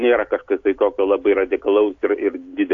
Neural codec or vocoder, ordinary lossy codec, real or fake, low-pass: none; AAC, 48 kbps; real; 7.2 kHz